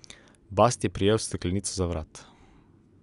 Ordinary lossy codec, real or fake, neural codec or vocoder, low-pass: none; real; none; 10.8 kHz